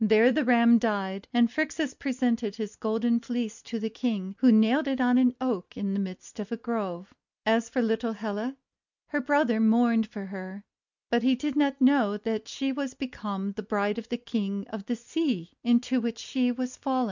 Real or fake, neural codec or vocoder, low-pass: real; none; 7.2 kHz